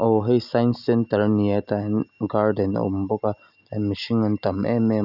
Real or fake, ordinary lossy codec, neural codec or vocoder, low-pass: real; none; none; 5.4 kHz